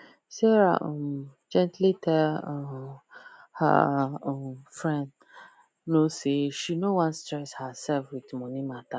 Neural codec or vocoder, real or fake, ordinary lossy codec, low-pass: none; real; none; none